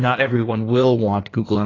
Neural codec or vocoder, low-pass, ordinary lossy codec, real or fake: codec, 16 kHz in and 24 kHz out, 1.1 kbps, FireRedTTS-2 codec; 7.2 kHz; AAC, 32 kbps; fake